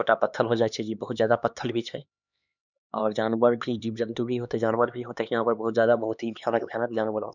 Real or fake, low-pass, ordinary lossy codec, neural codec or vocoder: fake; 7.2 kHz; none; codec, 16 kHz, 2 kbps, X-Codec, HuBERT features, trained on LibriSpeech